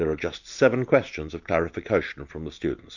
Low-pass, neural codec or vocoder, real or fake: 7.2 kHz; none; real